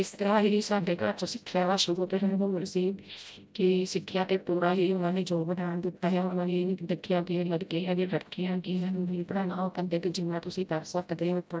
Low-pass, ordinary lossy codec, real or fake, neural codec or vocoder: none; none; fake; codec, 16 kHz, 0.5 kbps, FreqCodec, smaller model